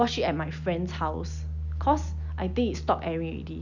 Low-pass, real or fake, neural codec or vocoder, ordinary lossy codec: 7.2 kHz; real; none; none